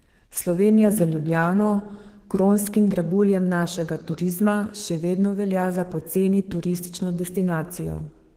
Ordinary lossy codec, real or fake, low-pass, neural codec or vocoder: Opus, 16 kbps; fake; 14.4 kHz; codec, 32 kHz, 1.9 kbps, SNAC